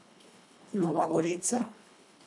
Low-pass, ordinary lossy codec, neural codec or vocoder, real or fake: none; none; codec, 24 kHz, 1.5 kbps, HILCodec; fake